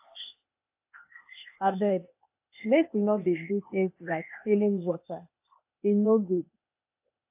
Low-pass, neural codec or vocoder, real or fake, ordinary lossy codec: 3.6 kHz; codec, 16 kHz, 0.8 kbps, ZipCodec; fake; AAC, 32 kbps